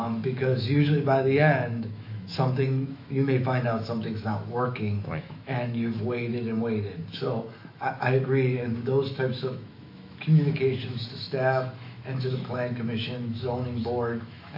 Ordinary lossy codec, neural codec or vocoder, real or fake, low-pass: MP3, 32 kbps; autoencoder, 48 kHz, 128 numbers a frame, DAC-VAE, trained on Japanese speech; fake; 5.4 kHz